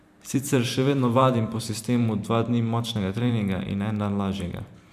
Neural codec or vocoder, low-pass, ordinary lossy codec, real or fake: vocoder, 44.1 kHz, 128 mel bands every 512 samples, BigVGAN v2; 14.4 kHz; none; fake